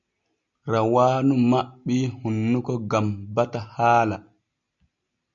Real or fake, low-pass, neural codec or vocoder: real; 7.2 kHz; none